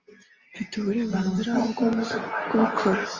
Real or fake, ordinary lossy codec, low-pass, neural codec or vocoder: real; Opus, 32 kbps; 7.2 kHz; none